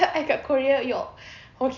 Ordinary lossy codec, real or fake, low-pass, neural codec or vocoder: none; real; 7.2 kHz; none